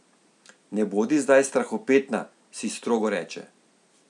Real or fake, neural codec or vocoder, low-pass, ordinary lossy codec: real; none; 10.8 kHz; none